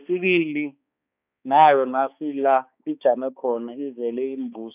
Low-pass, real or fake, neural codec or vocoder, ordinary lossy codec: 3.6 kHz; fake; codec, 16 kHz, 2 kbps, X-Codec, HuBERT features, trained on balanced general audio; none